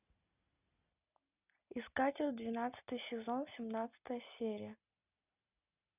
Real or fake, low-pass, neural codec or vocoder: real; 3.6 kHz; none